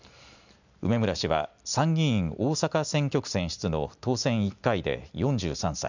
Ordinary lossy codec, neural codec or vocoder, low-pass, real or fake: none; none; 7.2 kHz; real